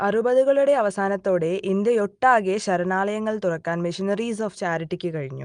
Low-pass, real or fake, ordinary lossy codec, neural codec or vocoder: 9.9 kHz; real; Opus, 32 kbps; none